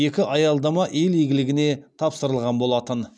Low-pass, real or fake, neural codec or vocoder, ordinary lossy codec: none; real; none; none